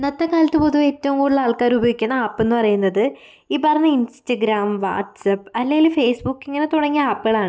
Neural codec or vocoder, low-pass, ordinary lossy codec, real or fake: none; none; none; real